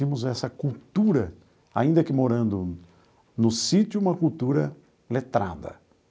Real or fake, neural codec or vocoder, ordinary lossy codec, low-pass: real; none; none; none